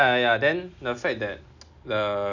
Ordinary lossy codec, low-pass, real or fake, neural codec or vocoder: none; 7.2 kHz; real; none